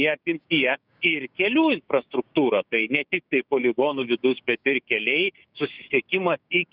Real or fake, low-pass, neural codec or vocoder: fake; 5.4 kHz; codec, 16 kHz, 6 kbps, DAC